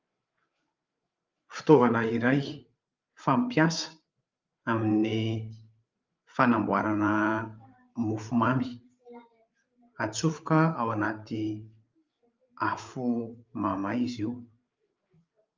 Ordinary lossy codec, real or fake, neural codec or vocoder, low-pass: Opus, 24 kbps; fake; codec, 16 kHz, 8 kbps, FreqCodec, larger model; 7.2 kHz